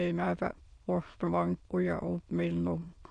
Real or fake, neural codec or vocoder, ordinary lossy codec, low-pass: fake; autoencoder, 22.05 kHz, a latent of 192 numbers a frame, VITS, trained on many speakers; AAC, 64 kbps; 9.9 kHz